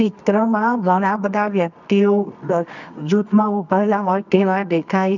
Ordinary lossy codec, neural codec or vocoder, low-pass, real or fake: none; codec, 24 kHz, 0.9 kbps, WavTokenizer, medium music audio release; 7.2 kHz; fake